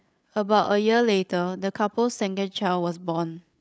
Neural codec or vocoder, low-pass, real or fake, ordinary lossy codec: codec, 16 kHz, 8 kbps, FreqCodec, larger model; none; fake; none